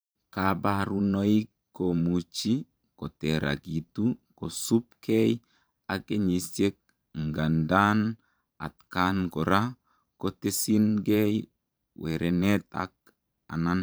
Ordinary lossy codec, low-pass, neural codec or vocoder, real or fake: none; none; vocoder, 44.1 kHz, 128 mel bands every 512 samples, BigVGAN v2; fake